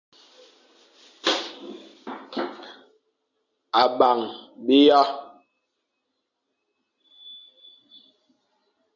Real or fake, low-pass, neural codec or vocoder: real; 7.2 kHz; none